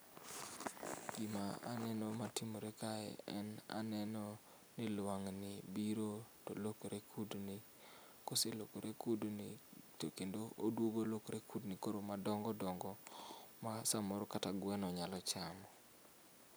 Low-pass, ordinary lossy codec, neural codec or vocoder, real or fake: none; none; none; real